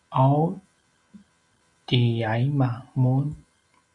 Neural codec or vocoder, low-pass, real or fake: none; 10.8 kHz; real